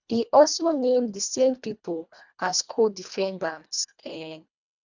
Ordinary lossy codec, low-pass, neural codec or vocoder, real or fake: none; 7.2 kHz; codec, 24 kHz, 1.5 kbps, HILCodec; fake